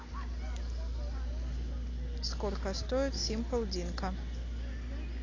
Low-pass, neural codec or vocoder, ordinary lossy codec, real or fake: 7.2 kHz; none; AAC, 32 kbps; real